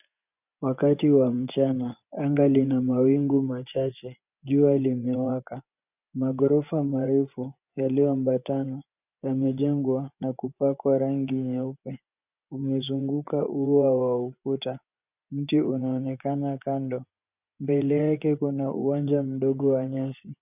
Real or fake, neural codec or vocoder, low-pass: fake; vocoder, 24 kHz, 100 mel bands, Vocos; 3.6 kHz